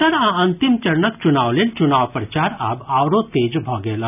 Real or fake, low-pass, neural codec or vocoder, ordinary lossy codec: real; 3.6 kHz; none; none